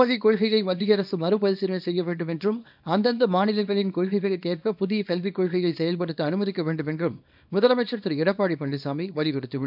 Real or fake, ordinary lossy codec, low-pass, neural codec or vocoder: fake; none; 5.4 kHz; codec, 24 kHz, 0.9 kbps, WavTokenizer, small release